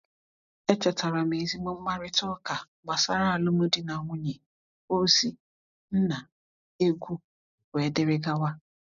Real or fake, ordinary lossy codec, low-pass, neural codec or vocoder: real; none; 7.2 kHz; none